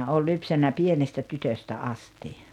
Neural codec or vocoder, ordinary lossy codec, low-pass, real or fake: none; none; 19.8 kHz; real